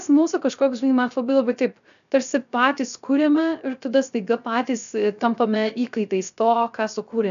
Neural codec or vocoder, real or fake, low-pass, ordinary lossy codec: codec, 16 kHz, 0.3 kbps, FocalCodec; fake; 7.2 kHz; MP3, 96 kbps